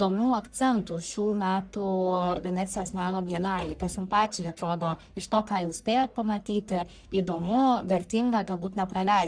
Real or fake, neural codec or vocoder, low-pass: fake; codec, 44.1 kHz, 1.7 kbps, Pupu-Codec; 9.9 kHz